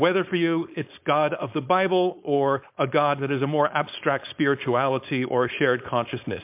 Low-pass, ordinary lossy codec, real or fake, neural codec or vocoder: 3.6 kHz; MP3, 32 kbps; fake; codec, 16 kHz, 4.8 kbps, FACodec